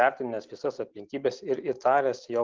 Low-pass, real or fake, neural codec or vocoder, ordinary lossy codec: 7.2 kHz; real; none; Opus, 32 kbps